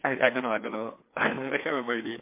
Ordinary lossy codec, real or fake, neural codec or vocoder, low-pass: MP3, 24 kbps; fake; codec, 16 kHz, 2 kbps, FreqCodec, larger model; 3.6 kHz